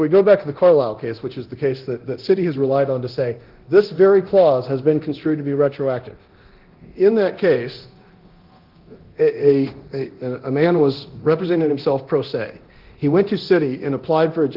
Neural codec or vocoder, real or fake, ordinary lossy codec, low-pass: codec, 24 kHz, 0.9 kbps, DualCodec; fake; Opus, 16 kbps; 5.4 kHz